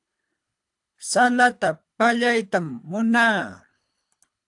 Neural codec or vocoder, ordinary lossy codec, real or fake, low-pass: codec, 24 kHz, 3 kbps, HILCodec; AAC, 64 kbps; fake; 10.8 kHz